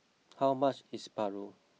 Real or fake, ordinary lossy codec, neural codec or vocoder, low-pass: real; none; none; none